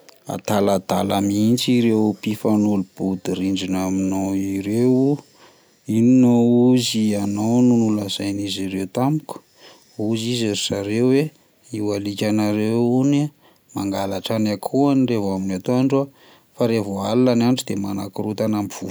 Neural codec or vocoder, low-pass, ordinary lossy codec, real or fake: none; none; none; real